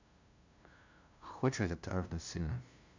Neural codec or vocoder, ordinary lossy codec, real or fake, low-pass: codec, 16 kHz, 0.5 kbps, FunCodec, trained on LibriTTS, 25 frames a second; MP3, 64 kbps; fake; 7.2 kHz